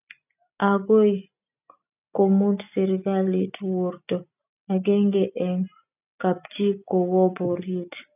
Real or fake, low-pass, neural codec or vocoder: real; 3.6 kHz; none